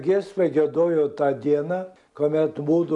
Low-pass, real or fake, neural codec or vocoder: 10.8 kHz; real; none